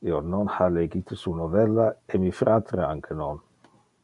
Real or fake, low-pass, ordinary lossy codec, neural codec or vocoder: real; 10.8 kHz; MP3, 96 kbps; none